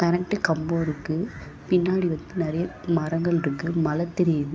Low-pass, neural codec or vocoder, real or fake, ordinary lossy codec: none; none; real; none